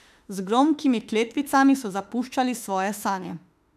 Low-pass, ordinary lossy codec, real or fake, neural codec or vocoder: 14.4 kHz; none; fake; autoencoder, 48 kHz, 32 numbers a frame, DAC-VAE, trained on Japanese speech